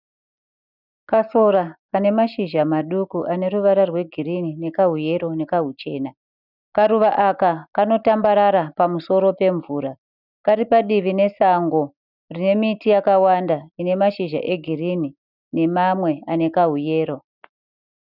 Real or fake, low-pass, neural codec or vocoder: real; 5.4 kHz; none